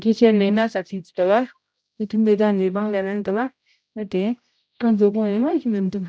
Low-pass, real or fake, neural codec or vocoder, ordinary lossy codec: none; fake; codec, 16 kHz, 0.5 kbps, X-Codec, HuBERT features, trained on general audio; none